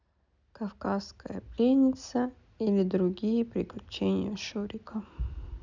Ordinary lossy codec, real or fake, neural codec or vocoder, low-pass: none; real; none; 7.2 kHz